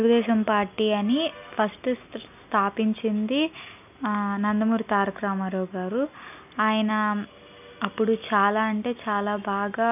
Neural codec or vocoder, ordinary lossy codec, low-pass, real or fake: none; none; 3.6 kHz; real